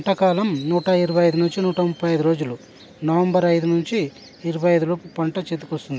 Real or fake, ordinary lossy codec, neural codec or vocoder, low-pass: real; none; none; none